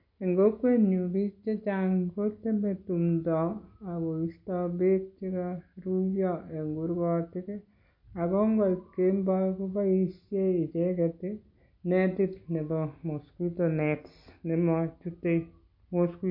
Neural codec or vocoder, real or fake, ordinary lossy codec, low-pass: none; real; MP3, 32 kbps; 5.4 kHz